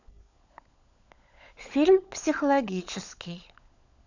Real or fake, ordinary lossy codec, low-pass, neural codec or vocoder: fake; none; 7.2 kHz; codec, 16 kHz, 16 kbps, FunCodec, trained on LibriTTS, 50 frames a second